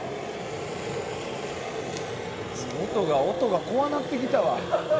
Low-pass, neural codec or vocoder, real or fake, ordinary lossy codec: none; none; real; none